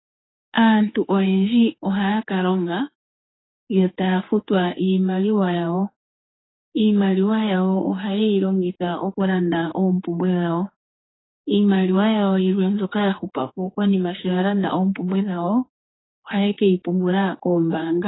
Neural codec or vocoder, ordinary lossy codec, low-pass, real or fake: codec, 16 kHz, 4 kbps, X-Codec, HuBERT features, trained on general audio; AAC, 16 kbps; 7.2 kHz; fake